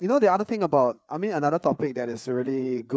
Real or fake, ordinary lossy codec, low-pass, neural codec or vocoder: fake; none; none; codec, 16 kHz, 4 kbps, FreqCodec, larger model